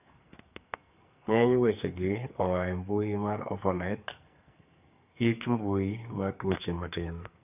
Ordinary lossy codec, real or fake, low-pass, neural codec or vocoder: none; fake; 3.6 kHz; codec, 44.1 kHz, 2.6 kbps, SNAC